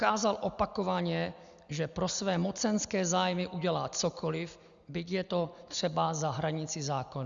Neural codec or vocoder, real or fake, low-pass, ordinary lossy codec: none; real; 7.2 kHz; Opus, 64 kbps